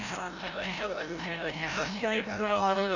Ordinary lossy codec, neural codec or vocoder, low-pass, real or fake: none; codec, 16 kHz, 0.5 kbps, FreqCodec, larger model; 7.2 kHz; fake